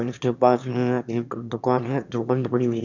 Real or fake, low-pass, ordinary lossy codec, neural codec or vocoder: fake; 7.2 kHz; none; autoencoder, 22.05 kHz, a latent of 192 numbers a frame, VITS, trained on one speaker